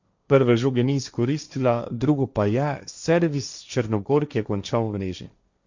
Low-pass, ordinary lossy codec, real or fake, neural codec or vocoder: 7.2 kHz; Opus, 64 kbps; fake; codec, 16 kHz, 1.1 kbps, Voila-Tokenizer